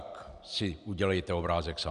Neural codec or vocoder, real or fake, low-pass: none; real; 10.8 kHz